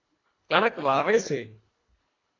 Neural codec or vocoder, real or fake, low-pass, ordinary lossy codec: codec, 24 kHz, 1.5 kbps, HILCodec; fake; 7.2 kHz; AAC, 32 kbps